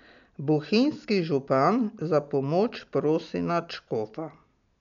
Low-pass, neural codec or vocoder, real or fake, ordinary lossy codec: 7.2 kHz; none; real; none